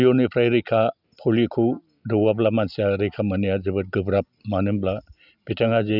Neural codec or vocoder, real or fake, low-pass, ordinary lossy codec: none; real; 5.4 kHz; none